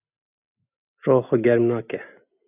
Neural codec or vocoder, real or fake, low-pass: none; real; 3.6 kHz